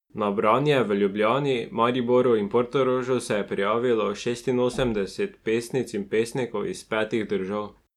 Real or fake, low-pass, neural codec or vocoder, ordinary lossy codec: real; 19.8 kHz; none; none